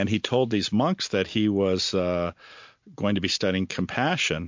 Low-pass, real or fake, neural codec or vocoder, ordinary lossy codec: 7.2 kHz; real; none; MP3, 48 kbps